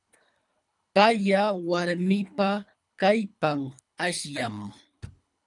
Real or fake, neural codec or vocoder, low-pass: fake; codec, 24 kHz, 3 kbps, HILCodec; 10.8 kHz